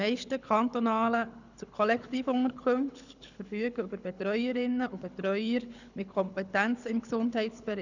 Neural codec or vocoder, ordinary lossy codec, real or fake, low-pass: codec, 24 kHz, 6 kbps, HILCodec; none; fake; 7.2 kHz